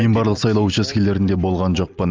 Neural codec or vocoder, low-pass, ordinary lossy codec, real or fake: none; 7.2 kHz; Opus, 24 kbps; real